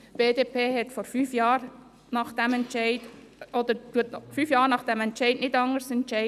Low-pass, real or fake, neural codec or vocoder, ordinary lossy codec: 14.4 kHz; fake; vocoder, 44.1 kHz, 128 mel bands every 256 samples, BigVGAN v2; none